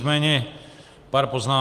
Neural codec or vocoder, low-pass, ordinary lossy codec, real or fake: none; 14.4 kHz; Opus, 24 kbps; real